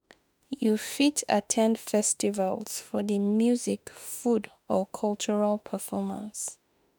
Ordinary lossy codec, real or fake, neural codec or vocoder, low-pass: none; fake; autoencoder, 48 kHz, 32 numbers a frame, DAC-VAE, trained on Japanese speech; none